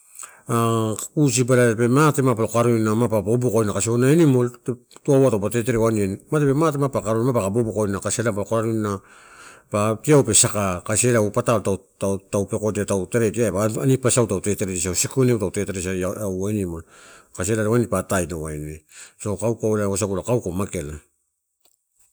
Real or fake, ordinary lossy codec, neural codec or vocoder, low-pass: real; none; none; none